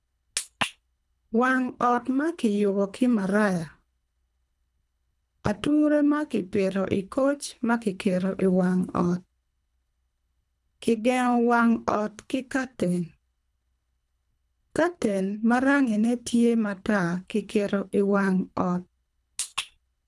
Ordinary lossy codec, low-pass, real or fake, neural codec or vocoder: none; none; fake; codec, 24 kHz, 3 kbps, HILCodec